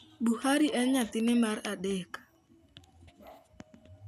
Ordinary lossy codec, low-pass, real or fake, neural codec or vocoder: none; 14.4 kHz; real; none